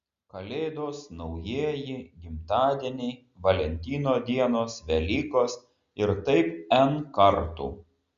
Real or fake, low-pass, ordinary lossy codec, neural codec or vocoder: real; 7.2 kHz; Opus, 64 kbps; none